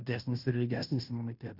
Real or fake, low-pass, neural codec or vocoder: fake; 5.4 kHz; codec, 16 kHz in and 24 kHz out, 0.9 kbps, LongCat-Audio-Codec, fine tuned four codebook decoder